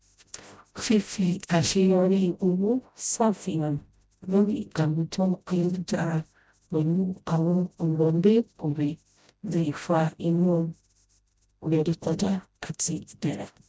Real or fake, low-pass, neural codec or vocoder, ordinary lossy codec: fake; none; codec, 16 kHz, 0.5 kbps, FreqCodec, smaller model; none